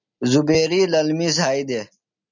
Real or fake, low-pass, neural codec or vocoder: real; 7.2 kHz; none